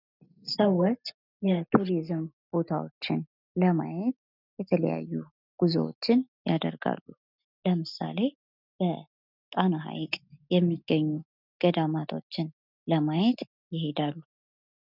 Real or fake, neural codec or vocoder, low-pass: real; none; 5.4 kHz